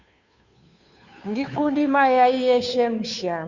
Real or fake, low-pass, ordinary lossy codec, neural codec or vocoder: fake; 7.2 kHz; Opus, 64 kbps; codec, 16 kHz, 2 kbps, FunCodec, trained on Chinese and English, 25 frames a second